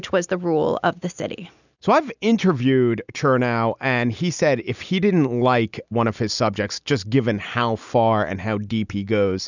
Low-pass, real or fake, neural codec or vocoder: 7.2 kHz; real; none